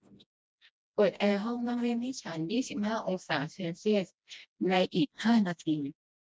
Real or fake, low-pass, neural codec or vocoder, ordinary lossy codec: fake; none; codec, 16 kHz, 1 kbps, FreqCodec, smaller model; none